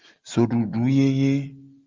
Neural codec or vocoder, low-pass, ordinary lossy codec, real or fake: none; 7.2 kHz; Opus, 24 kbps; real